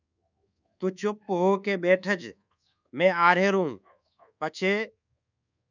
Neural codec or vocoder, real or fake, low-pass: codec, 24 kHz, 1.2 kbps, DualCodec; fake; 7.2 kHz